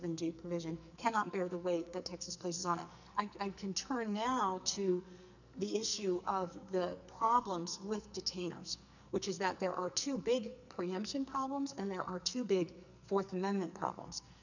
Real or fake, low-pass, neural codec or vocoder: fake; 7.2 kHz; codec, 44.1 kHz, 2.6 kbps, SNAC